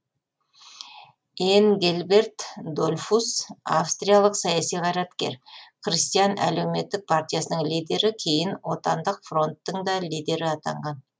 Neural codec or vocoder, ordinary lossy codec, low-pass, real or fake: none; none; none; real